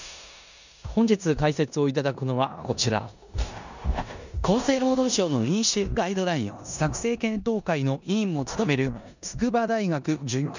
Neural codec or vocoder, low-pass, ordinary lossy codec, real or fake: codec, 16 kHz in and 24 kHz out, 0.9 kbps, LongCat-Audio-Codec, four codebook decoder; 7.2 kHz; none; fake